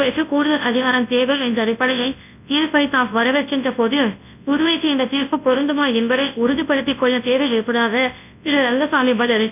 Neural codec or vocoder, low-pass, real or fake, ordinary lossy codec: codec, 24 kHz, 0.9 kbps, WavTokenizer, large speech release; 3.6 kHz; fake; none